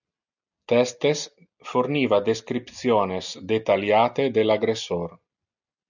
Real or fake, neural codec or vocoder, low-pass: real; none; 7.2 kHz